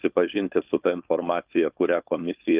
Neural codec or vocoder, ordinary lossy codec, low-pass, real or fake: codec, 16 kHz, 4.8 kbps, FACodec; Opus, 24 kbps; 3.6 kHz; fake